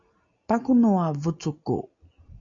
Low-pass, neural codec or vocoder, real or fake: 7.2 kHz; none; real